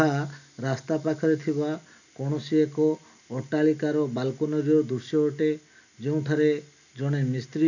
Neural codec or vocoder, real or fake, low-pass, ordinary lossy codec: none; real; 7.2 kHz; none